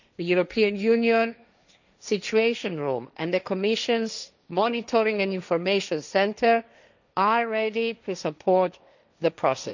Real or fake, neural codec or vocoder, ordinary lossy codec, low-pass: fake; codec, 16 kHz, 1.1 kbps, Voila-Tokenizer; none; 7.2 kHz